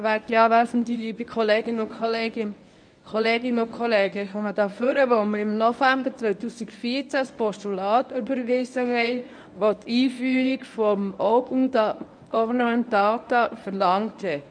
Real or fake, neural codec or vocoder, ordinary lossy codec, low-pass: fake; codec, 24 kHz, 0.9 kbps, WavTokenizer, medium speech release version 1; none; 9.9 kHz